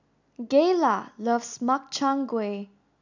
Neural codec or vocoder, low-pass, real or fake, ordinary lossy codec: none; 7.2 kHz; real; none